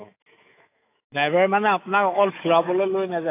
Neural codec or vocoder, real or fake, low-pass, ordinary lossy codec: autoencoder, 48 kHz, 128 numbers a frame, DAC-VAE, trained on Japanese speech; fake; 3.6 kHz; AAC, 24 kbps